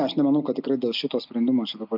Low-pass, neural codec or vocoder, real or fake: 5.4 kHz; none; real